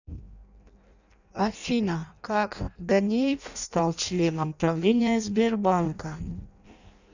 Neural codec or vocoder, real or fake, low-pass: codec, 16 kHz in and 24 kHz out, 0.6 kbps, FireRedTTS-2 codec; fake; 7.2 kHz